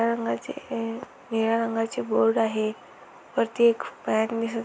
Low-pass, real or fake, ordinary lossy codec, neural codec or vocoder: none; real; none; none